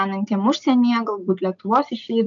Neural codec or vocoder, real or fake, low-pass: none; real; 7.2 kHz